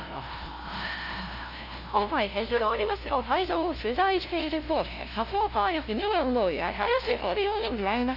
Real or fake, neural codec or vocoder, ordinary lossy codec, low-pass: fake; codec, 16 kHz, 0.5 kbps, FunCodec, trained on LibriTTS, 25 frames a second; none; 5.4 kHz